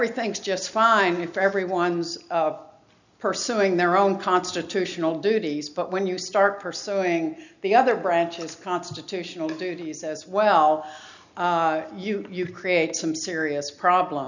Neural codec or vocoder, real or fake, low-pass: none; real; 7.2 kHz